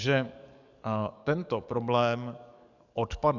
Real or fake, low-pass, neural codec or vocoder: fake; 7.2 kHz; codec, 44.1 kHz, 7.8 kbps, DAC